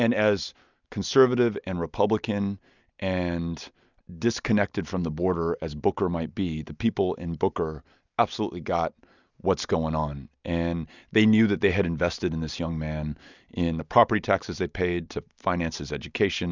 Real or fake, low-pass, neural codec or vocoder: real; 7.2 kHz; none